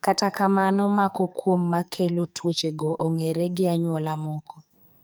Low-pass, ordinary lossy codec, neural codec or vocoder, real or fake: none; none; codec, 44.1 kHz, 2.6 kbps, SNAC; fake